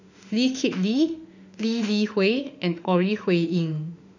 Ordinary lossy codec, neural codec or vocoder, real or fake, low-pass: none; autoencoder, 48 kHz, 32 numbers a frame, DAC-VAE, trained on Japanese speech; fake; 7.2 kHz